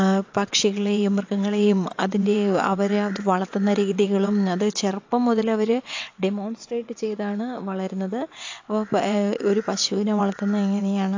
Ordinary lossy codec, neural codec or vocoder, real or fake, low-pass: AAC, 48 kbps; vocoder, 22.05 kHz, 80 mel bands, WaveNeXt; fake; 7.2 kHz